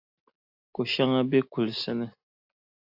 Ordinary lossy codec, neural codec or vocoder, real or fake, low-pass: AAC, 48 kbps; none; real; 5.4 kHz